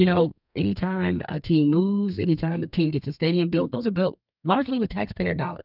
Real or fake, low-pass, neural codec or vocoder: fake; 5.4 kHz; codec, 32 kHz, 1.9 kbps, SNAC